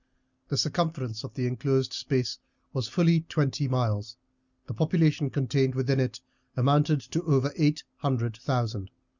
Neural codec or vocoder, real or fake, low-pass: none; real; 7.2 kHz